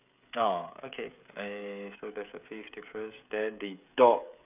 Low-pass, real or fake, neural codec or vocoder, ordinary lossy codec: 3.6 kHz; fake; codec, 16 kHz, 16 kbps, FreqCodec, smaller model; none